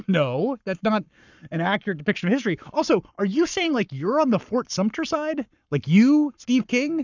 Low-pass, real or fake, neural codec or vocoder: 7.2 kHz; fake; codec, 44.1 kHz, 7.8 kbps, Pupu-Codec